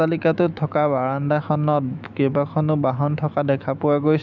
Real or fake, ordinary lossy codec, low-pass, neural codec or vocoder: fake; none; 7.2 kHz; autoencoder, 48 kHz, 128 numbers a frame, DAC-VAE, trained on Japanese speech